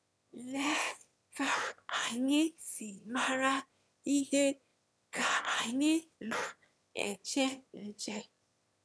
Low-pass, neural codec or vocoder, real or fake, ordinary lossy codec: none; autoencoder, 22.05 kHz, a latent of 192 numbers a frame, VITS, trained on one speaker; fake; none